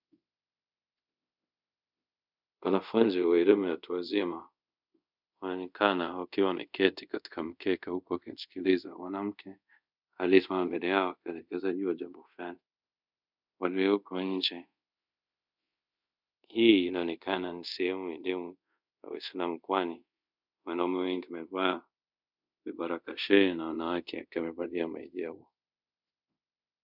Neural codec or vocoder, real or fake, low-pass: codec, 24 kHz, 0.5 kbps, DualCodec; fake; 5.4 kHz